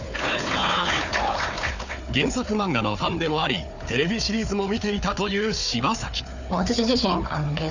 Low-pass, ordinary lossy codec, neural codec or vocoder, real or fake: 7.2 kHz; none; codec, 16 kHz, 4 kbps, FunCodec, trained on Chinese and English, 50 frames a second; fake